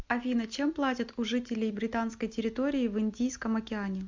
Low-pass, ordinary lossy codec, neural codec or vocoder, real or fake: 7.2 kHz; MP3, 64 kbps; none; real